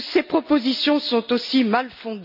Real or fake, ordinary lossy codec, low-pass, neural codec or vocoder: real; none; 5.4 kHz; none